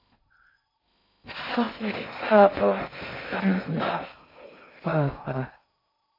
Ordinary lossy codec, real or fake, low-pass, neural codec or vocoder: MP3, 32 kbps; fake; 5.4 kHz; codec, 16 kHz in and 24 kHz out, 0.6 kbps, FocalCodec, streaming, 2048 codes